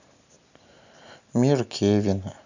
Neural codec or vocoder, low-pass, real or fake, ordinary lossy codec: none; 7.2 kHz; real; none